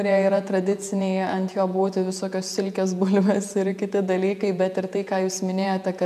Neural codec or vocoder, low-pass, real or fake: vocoder, 48 kHz, 128 mel bands, Vocos; 14.4 kHz; fake